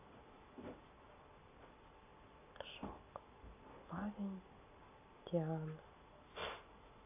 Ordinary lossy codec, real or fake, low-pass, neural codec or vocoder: AAC, 32 kbps; real; 3.6 kHz; none